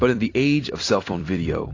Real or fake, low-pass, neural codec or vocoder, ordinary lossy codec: fake; 7.2 kHz; vocoder, 44.1 kHz, 128 mel bands every 256 samples, BigVGAN v2; AAC, 32 kbps